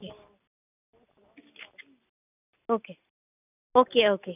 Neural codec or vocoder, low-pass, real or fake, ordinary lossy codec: none; 3.6 kHz; real; none